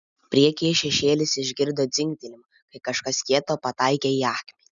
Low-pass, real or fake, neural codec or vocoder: 7.2 kHz; real; none